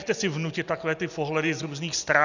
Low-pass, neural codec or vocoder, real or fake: 7.2 kHz; none; real